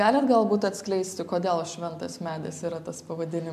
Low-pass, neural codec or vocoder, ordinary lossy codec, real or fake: 14.4 kHz; none; MP3, 96 kbps; real